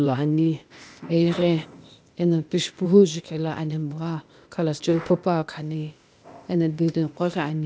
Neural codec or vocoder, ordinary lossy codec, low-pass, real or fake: codec, 16 kHz, 0.8 kbps, ZipCodec; none; none; fake